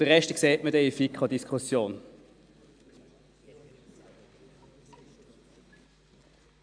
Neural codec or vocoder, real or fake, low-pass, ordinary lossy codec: none; real; 9.9 kHz; AAC, 64 kbps